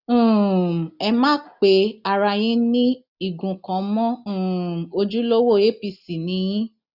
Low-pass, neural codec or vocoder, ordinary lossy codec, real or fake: 5.4 kHz; none; none; real